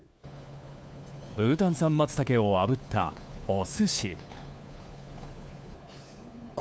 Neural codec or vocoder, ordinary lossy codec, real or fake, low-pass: codec, 16 kHz, 4 kbps, FunCodec, trained on LibriTTS, 50 frames a second; none; fake; none